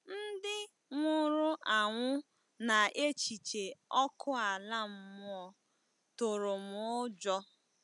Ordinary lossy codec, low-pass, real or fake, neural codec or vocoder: none; 10.8 kHz; real; none